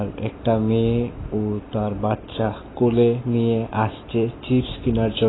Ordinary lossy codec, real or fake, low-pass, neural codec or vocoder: AAC, 16 kbps; real; 7.2 kHz; none